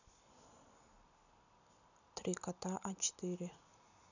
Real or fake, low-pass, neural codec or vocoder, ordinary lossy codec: real; 7.2 kHz; none; none